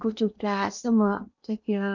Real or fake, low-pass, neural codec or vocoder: fake; 7.2 kHz; codec, 16 kHz in and 24 kHz out, 0.8 kbps, FocalCodec, streaming, 65536 codes